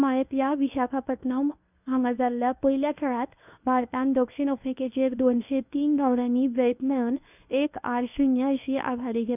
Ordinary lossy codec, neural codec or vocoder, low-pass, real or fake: none; codec, 24 kHz, 0.9 kbps, WavTokenizer, medium speech release version 1; 3.6 kHz; fake